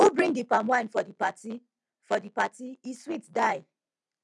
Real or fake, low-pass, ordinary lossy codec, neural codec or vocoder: real; 10.8 kHz; none; none